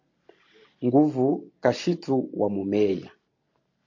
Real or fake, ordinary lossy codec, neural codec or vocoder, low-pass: real; MP3, 48 kbps; none; 7.2 kHz